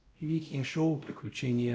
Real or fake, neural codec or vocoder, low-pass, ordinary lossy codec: fake; codec, 16 kHz, 0.5 kbps, X-Codec, WavLM features, trained on Multilingual LibriSpeech; none; none